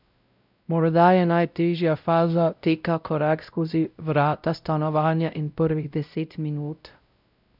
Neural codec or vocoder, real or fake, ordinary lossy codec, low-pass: codec, 16 kHz, 0.5 kbps, X-Codec, WavLM features, trained on Multilingual LibriSpeech; fake; none; 5.4 kHz